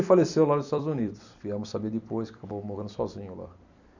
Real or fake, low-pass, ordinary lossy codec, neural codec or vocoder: fake; 7.2 kHz; none; vocoder, 44.1 kHz, 128 mel bands every 256 samples, BigVGAN v2